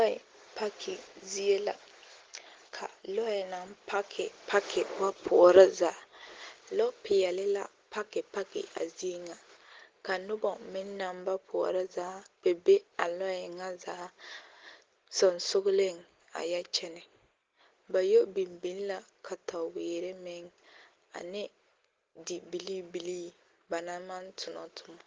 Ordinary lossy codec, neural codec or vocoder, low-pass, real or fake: Opus, 16 kbps; none; 7.2 kHz; real